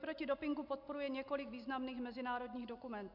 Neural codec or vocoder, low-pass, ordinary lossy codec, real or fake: none; 5.4 kHz; MP3, 48 kbps; real